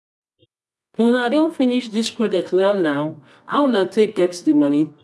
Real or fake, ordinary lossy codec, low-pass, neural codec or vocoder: fake; none; none; codec, 24 kHz, 0.9 kbps, WavTokenizer, medium music audio release